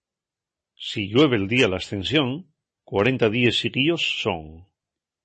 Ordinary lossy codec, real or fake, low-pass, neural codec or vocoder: MP3, 32 kbps; real; 10.8 kHz; none